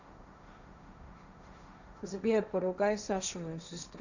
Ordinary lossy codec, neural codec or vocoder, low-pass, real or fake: none; codec, 16 kHz, 1.1 kbps, Voila-Tokenizer; 7.2 kHz; fake